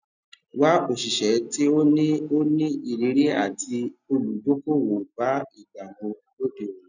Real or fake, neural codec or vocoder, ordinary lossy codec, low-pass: real; none; none; 7.2 kHz